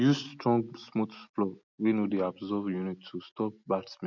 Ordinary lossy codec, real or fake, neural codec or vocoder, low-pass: none; real; none; 7.2 kHz